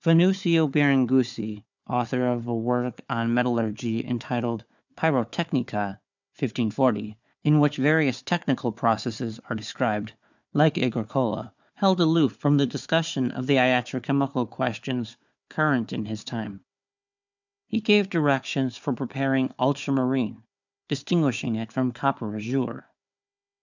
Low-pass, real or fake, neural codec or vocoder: 7.2 kHz; fake; codec, 16 kHz, 4 kbps, FunCodec, trained on Chinese and English, 50 frames a second